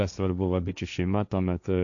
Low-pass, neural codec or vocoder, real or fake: 7.2 kHz; codec, 16 kHz, 1.1 kbps, Voila-Tokenizer; fake